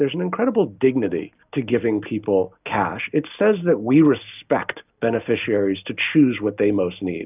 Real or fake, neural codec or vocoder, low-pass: real; none; 3.6 kHz